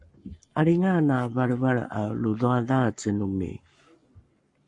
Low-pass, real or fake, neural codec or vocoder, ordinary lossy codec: 10.8 kHz; fake; codec, 44.1 kHz, 7.8 kbps, Pupu-Codec; MP3, 48 kbps